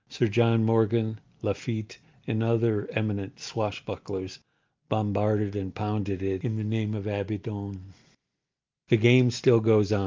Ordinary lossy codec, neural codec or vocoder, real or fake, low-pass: Opus, 24 kbps; none; real; 7.2 kHz